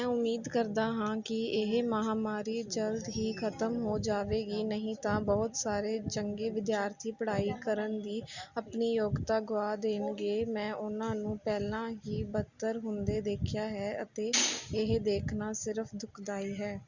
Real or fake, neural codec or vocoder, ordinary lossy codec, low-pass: real; none; Opus, 64 kbps; 7.2 kHz